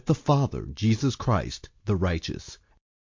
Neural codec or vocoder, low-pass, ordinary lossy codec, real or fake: none; 7.2 kHz; MP3, 48 kbps; real